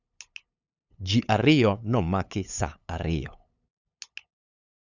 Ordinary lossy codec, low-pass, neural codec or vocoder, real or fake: none; 7.2 kHz; codec, 16 kHz, 8 kbps, FunCodec, trained on LibriTTS, 25 frames a second; fake